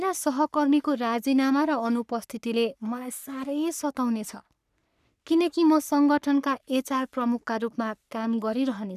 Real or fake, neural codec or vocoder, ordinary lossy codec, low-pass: fake; codec, 44.1 kHz, 3.4 kbps, Pupu-Codec; none; 14.4 kHz